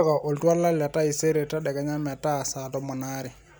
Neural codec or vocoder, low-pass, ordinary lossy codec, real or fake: none; none; none; real